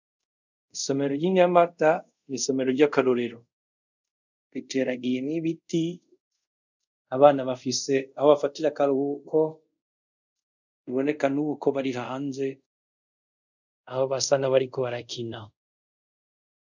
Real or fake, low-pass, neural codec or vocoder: fake; 7.2 kHz; codec, 24 kHz, 0.5 kbps, DualCodec